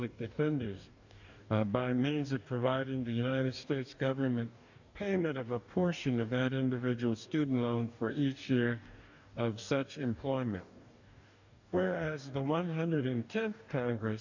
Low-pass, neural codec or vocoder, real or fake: 7.2 kHz; codec, 44.1 kHz, 2.6 kbps, DAC; fake